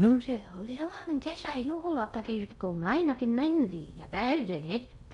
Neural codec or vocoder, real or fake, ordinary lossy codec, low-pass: codec, 16 kHz in and 24 kHz out, 0.6 kbps, FocalCodec, streaming, 4096 codes; fake; none; 10.8 kHz